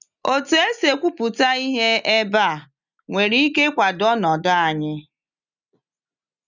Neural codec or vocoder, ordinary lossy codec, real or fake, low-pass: none; none; real; 7.2 kHz